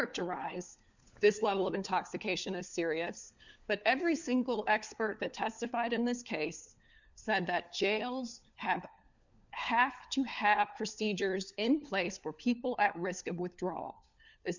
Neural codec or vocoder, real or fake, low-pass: codec, 16 kHz, 4 kbps, FunCodec, trained on LibriTTS, 50 frames a second; fake; 7.2 kHz